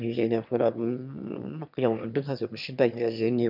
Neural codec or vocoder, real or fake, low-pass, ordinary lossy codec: autoencoder, 22.05 kHz, a latent of 192 numbers a frame, VITS, trained on one speaker; fake; 5.4 kHz; none